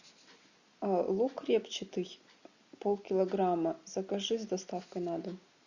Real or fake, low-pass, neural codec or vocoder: real; 7.2 kHz; none